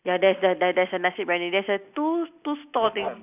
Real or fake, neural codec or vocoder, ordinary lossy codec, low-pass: real; none; none; 3.6 kHz